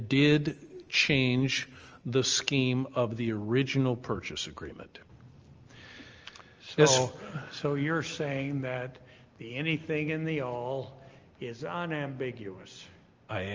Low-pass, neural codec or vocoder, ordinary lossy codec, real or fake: 7.2 kHz; none; Opus, 24 kbps; real